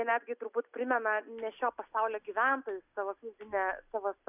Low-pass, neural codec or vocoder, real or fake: 3.6 kHz; none; real